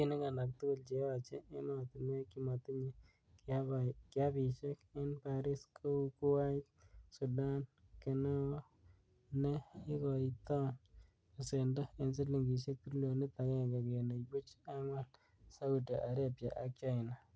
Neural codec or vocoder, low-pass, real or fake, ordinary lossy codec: none; none; real; none